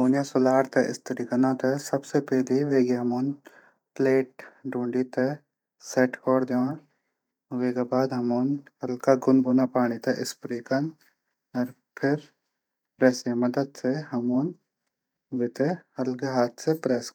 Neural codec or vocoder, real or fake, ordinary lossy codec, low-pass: vocoder, 44.1 kHz, 128 mel bands every 512 samples, BigVGAN v2; fake; none; 19.8 kHz